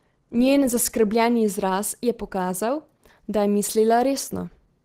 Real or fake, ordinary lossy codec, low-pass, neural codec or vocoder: real; Opus, 16 kbps; 14.4 kHz; none